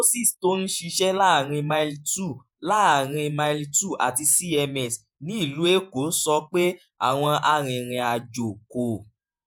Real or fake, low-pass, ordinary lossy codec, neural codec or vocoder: fake; none; none; vocoder, 48 kHz, 128 mel bands, Vocos